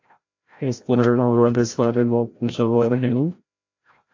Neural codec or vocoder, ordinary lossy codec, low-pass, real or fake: codec, 16 kHz, 0.5 kbps, FreqCodec, larger model; AAC, 48 kbps; 7.2 kHz; fake